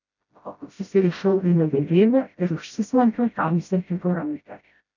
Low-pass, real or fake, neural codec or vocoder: 7.2 kHz; fake; codec, 16 kHz, 0.5 kbps, FreqCodec, smaller model